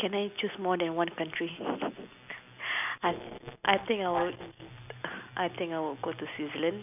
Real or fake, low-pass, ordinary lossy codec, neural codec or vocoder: real; 3.6 kHz; none; none